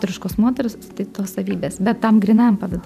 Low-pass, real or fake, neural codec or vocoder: 14.4 kHz; fake; vocoder, 44.1 kHz, 128 mel bands every 512 samples, BigVGAN v2